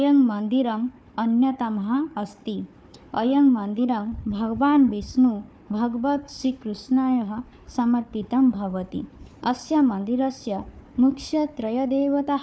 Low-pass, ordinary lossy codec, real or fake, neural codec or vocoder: none; none; fake; codec, 16 kHz, 4 kbps, FunCodec, trained on Chinese and English, 50 frames a second